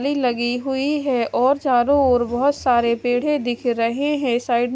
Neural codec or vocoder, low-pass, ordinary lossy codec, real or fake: none; none; none; real